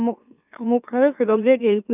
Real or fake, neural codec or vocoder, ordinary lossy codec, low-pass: fake; autoencoder, 44.1 kHz, a latent of 192 numbers a frame, MeloTTS; none; 3.6 kHz